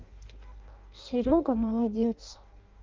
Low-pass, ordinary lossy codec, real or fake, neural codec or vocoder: 7.2 kHz; Opus, 24 kbps; fake; codec, 16 kHz in and 24 kHz out, 0.6 kbps, FireRedTTS-2 codec